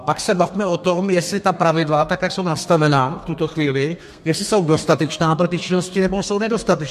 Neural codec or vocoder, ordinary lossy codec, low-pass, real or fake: codec, 32 kHz, 1.9 kbps, SNAC; MP3, 64 kbps; 14.4 kHz; fake